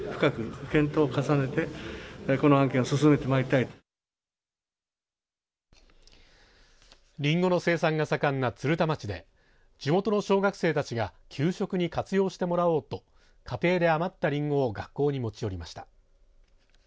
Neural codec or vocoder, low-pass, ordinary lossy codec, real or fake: none; none; none; real